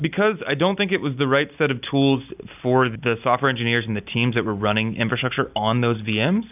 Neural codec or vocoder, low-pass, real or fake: none; 3.6 kHz; real